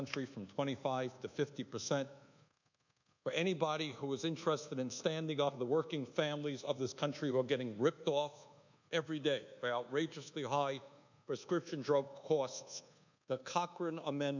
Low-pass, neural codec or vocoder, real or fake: 7.2 kHz; codec, 24 kHz, 1.2 kbps, DualCodec; fake